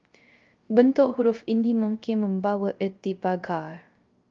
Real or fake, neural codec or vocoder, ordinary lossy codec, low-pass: fake; codec, 16 kHz, 0.3 kbps, FocalCodec; Opus, 32 kbps; 7.2 kHz